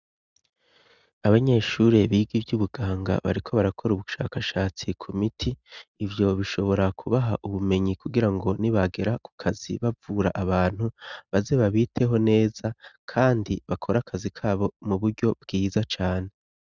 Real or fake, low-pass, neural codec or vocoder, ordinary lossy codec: real; 7.2 kHz; none; Opus, 64 kbps